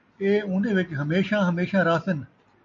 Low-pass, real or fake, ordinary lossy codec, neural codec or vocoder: 7.2 kHz; real; MP3, 48 kbps; none